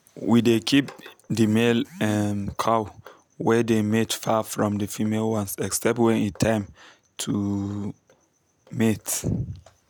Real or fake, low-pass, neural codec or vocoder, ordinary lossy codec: real; none; none; none